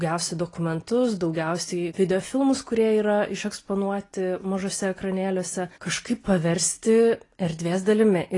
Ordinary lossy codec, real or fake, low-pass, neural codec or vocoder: AAC, 32 kbps; real; 10.8 kHz; none